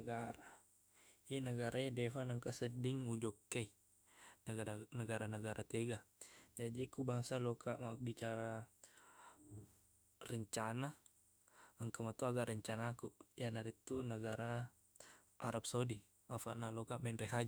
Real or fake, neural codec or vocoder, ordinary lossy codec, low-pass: fake; autoencoder, 48 kHz, 32 numbers a frame, DAC-VAE, trained on Japanese speech; none; none